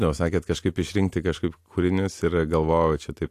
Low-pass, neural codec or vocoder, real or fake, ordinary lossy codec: 14.4 kHz; none; real; AAC, 64 kbps